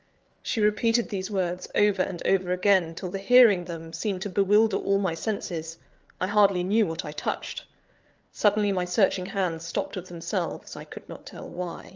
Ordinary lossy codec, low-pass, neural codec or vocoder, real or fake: Opus, 24 kbps; 7.2 kHz; codec, 16 kHz, 8 kbps, FreqCodec, larger model; fake